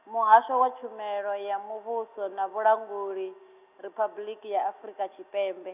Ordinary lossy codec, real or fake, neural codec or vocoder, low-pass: none; real; none; 3.6 kHz